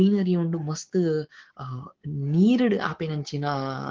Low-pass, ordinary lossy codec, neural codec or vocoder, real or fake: 7.2 kHz; Opus, 16 kbps; codec, 16 kHz, 6 kbps, DAC; fake